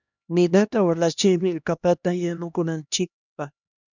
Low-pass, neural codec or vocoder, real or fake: 7.2 kHz; codec, 16 kHz, 1 kbps, X-Codec, HuBERT features, trained on LibriSpeech; fake